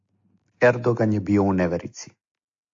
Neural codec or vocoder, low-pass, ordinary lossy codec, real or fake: none; 7.2 kHz; AAC, 48 kbps; real